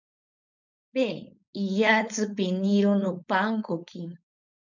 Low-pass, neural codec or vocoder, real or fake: 7.2 kHz; codec, 16 kHz, 4.8 kbps, FACodec; fake